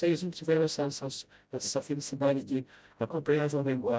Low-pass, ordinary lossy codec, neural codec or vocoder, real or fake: none; none; codec, 16 kHz, 0.5 kbps, FreqCodec, smaller model; fake